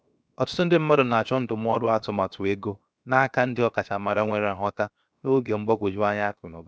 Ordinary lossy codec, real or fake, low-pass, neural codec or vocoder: none; fake; none; codec, 16 kHz, 0.7 kbps, FocalCodec